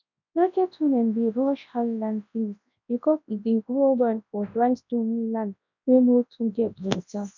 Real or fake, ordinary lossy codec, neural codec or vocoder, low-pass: fake; none; codec, 24 kHz, 0.9 kbps, WavTokenizer, large speech release; 7.2 kHz